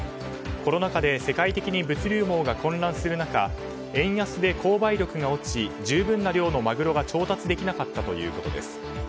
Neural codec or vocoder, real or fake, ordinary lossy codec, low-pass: none; real; none; none